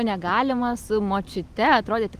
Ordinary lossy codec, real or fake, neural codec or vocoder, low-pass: Opus, 24 kbps; real; none; 14.4 kHz